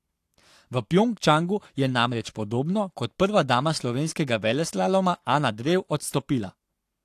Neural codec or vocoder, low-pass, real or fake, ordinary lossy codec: codec, 44.1 kHz, 7.8 kbps, Pupu-Codec; 14.4 kHz; fake; AAC, 64 kbps